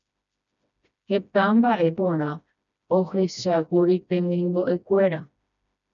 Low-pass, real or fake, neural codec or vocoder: 7.2 kHz; fake; codec, 16 kHz, 1 kbps, FreqCodec, smaller model